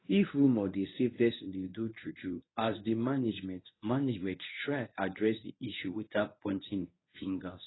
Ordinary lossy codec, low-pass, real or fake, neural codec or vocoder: AAC, 16 kbps; 7.2 kHz; fake; codec, 24 kHz, 0.9 kbps, WavTokenizer, medium speech release version 1